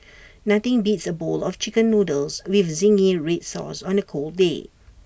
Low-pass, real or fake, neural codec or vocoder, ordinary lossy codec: none; real; none; none